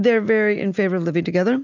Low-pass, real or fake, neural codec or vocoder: 7.2 kHz; real; none